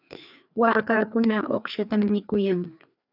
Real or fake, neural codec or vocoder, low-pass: fake; codec, 16 kHz, 2 kbps, FreqCodec, larger model; 5.4 kHz